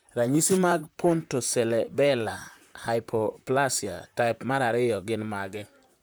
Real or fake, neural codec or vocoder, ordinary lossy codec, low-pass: fake; vocoder, 44.1 kHz, 128 mel bands, Pupu-Vocoder; none; none